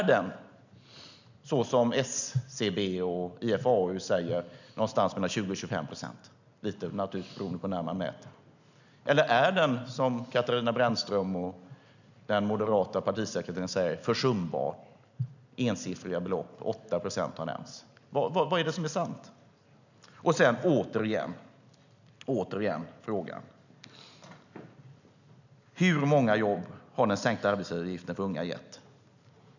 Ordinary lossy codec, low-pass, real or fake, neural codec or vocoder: none; 7.2 kHz; real; none